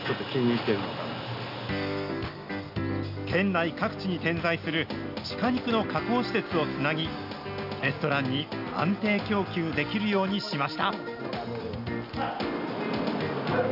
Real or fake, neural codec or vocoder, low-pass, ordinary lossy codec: real; none; 5.4 kHz; none